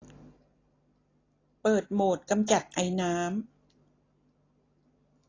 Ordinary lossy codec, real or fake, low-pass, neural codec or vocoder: AAC, 32 kbps; real; 7.2 kHz; none